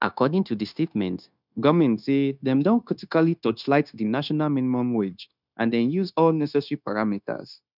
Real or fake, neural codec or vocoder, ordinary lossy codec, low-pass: fake; codec, 16 kHz, 0.9 kbps, LongCat-Audio-Codec; none; 5.4 kHz